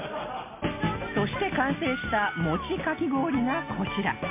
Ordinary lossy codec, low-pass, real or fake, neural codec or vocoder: MP3, 24 kbps; 3.6 kHz; real; none